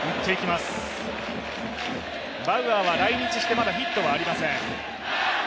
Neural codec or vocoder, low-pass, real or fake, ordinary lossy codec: none; none; real; none